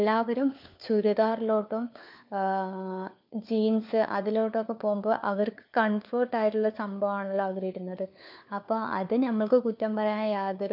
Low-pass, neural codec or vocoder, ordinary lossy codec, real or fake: 5.4 kHz; codec, 16 kHz, 4 kbps, FunCodec, trained on LibriTTS, 50 frames a second; none; fake